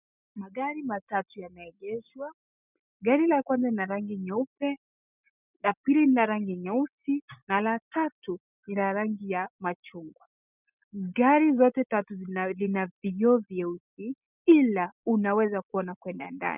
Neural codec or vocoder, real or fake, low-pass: none; real; 3.6 kHz